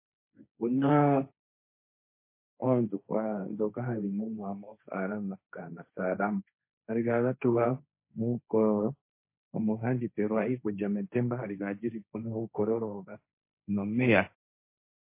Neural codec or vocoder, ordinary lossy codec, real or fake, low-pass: codec, 16 kHz, 1.1 kbps, Voila-Tokenizer; MP3, 24 kbps; fake; 3.6 kHz